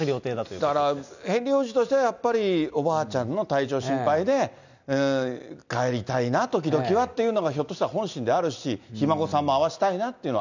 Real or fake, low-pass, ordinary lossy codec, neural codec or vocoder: real; 7.2 kHz; none; none